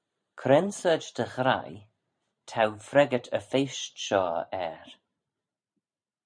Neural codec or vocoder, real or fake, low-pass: vocoder, 44.1 kHz, 128 mel bands every 512 samples, BigVGAN v2; fake; 9.9 kHz